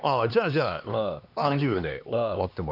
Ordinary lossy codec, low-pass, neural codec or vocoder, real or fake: none; 5.4 kHz; codec, 16 kHz, 2 kbps, X-Codec, HuBERT features, trained on LibriSpeech; fake